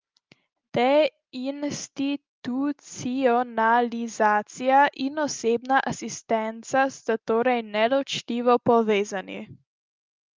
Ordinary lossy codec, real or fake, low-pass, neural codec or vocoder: Opus, 32 kbps; real; 7.2 kHz; none